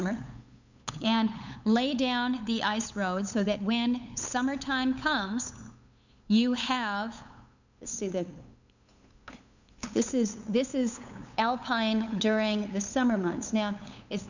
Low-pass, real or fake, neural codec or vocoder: 7.2 kHz; fake; codec, 16 kHz, 8 kbps, FunCodec, trained on LibriTTS, 25 frames a second